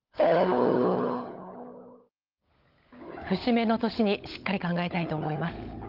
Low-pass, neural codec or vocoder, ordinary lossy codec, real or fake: 5.4 kHz; codec, 16 kHz, 16 kbps, FunCodec, trained on LibriTTS, 50 frames a second; Opus, 32 kbps; fake